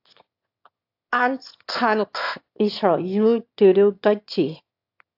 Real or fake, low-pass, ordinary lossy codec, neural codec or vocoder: fake; 5.4 kHz; AAC, 48 kbps; autoencoder, 22.05 kHz, a latent of 192 numbers a frame, VITS, trained on one speaker